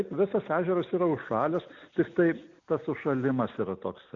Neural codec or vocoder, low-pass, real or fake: none; 7.2 kHz; real